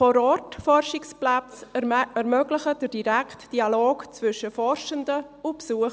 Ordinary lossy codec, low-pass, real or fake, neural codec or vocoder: none; none; real; none